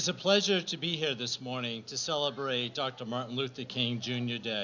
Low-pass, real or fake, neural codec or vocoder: 7.2 kHz; real; none